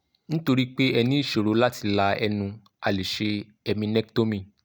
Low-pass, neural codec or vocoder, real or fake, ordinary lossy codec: none; none; real; none